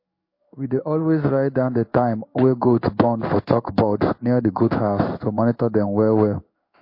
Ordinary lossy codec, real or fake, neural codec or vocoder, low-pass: MP3, 32 kbps; fake; codec, 16 kHz in and 24 kHz out, 1 kbps, XY-Tokenizer; 5.4 kHz